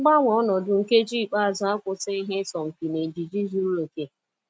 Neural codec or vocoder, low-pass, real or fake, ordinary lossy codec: none; none; real; none